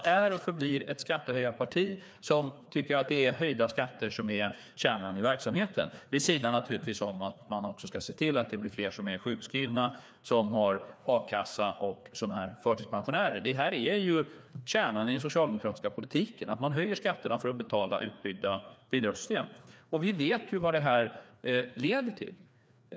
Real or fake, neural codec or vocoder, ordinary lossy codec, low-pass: fake; codec, 16 kHz, 2 kbps, FreqCodec, larger model; none; none